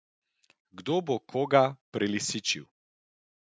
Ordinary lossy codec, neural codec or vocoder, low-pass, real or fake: none; none; none; real